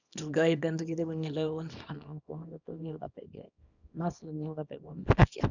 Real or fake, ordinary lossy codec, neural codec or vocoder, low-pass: fake; none; codec, 24 kHz, 0.9 kbps, WavTokenizer, small release; 7.2 kHz